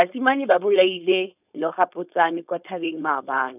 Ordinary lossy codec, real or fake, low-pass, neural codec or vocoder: none; fake; 3.6 kHz; codec, 16 kHz, 4.8 kbps, FACodec